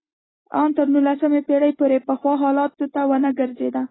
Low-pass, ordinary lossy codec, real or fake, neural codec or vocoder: 7.2 kHz; AAC, 16 kbps; real; none